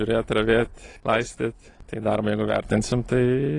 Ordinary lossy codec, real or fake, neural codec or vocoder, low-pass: AAC, 32 kbps; real; none; 10.8 kHz